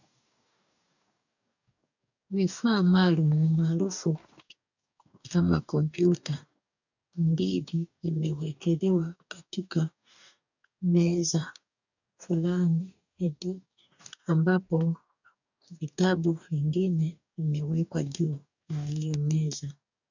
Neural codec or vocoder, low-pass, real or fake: codec, 44.1 kHz, 2.6 kbps, DAC; 7.2 kHz; fake